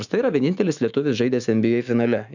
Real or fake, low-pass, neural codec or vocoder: fake; 7.2 kHz; autoencoder, 48 kHz, 128 numbers a frame, DAC-VAE, trained on Japanese speech